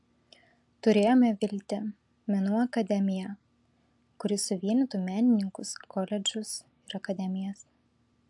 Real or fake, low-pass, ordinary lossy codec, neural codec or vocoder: real; 10.8 kHz; AAC, 64 kbps; none